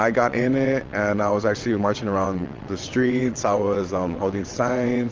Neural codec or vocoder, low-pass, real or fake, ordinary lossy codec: vocoder, 22.05 kHz, 80 mel bands, WaveNeXt; 7.2 kHz; fake; Opus, 32 kbps